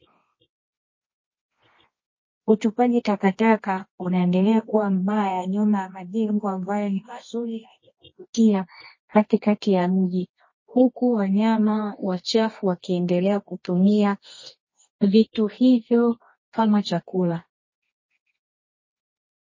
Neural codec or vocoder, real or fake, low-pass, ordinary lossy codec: codec, 24 kHz, 0.9 kbps, WavTokenizer, medium music audio release; fake; 7.2 kHz; MP3, 32 kbps